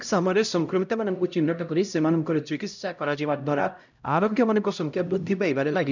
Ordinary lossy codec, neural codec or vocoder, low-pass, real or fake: none; codec, 16 kHz, 0.5 kbps, X-Codec, HuBERT features, trained on LibriSpeech; 7.2 kHz; fake